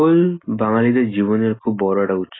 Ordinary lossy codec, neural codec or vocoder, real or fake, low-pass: AAC, 16 kbps; none; real; 7.2 kHz